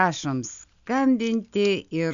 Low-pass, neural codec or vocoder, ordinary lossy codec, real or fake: 7.2 kHz; none; AAC, 96 kbps; real